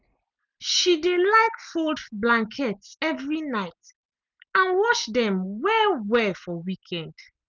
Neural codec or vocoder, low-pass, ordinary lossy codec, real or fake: none; none; none; real